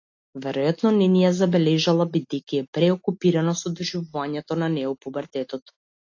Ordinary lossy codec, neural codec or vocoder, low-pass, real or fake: AAC, 48 kbps; none; 7.2 kHz; real